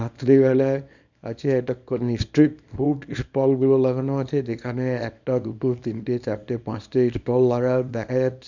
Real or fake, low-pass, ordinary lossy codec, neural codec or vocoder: fake; 7.2 kHz; none; codec, 24 kHz, 0.9 kbps, WavTokenizer, small release